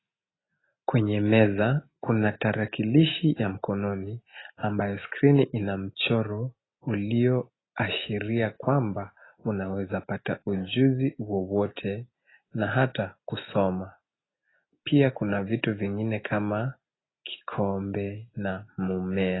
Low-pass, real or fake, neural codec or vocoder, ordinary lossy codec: 7.2 kHz; real; none; AAC, 16 kbps